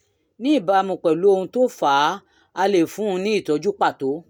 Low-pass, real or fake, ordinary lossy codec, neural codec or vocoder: 19.8 kHz; real; none; none